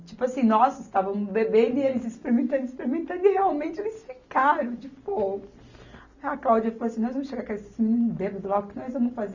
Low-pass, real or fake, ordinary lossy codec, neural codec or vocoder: 7.2 kHz; real; none; none